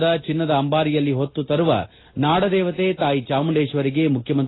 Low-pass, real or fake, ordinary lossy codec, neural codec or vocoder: 7.2 kHz; real; AAC, 16 kbps; none